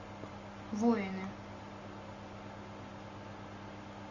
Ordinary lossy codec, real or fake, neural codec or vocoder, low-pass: Opus, 64 kbps; real; none; 7.2 kHz